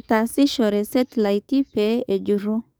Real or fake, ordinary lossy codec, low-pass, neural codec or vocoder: fake; none; none; codec, 44.1 kHz, 7.8 kbps, DAC